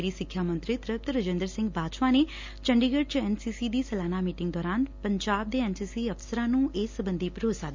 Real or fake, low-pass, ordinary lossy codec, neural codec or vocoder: real; 7.2 kHz; MP3, 64 kbps; none